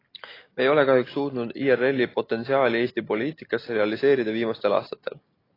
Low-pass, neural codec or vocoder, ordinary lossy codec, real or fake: 5.4 kHz; none; AAC, 24 kbps; real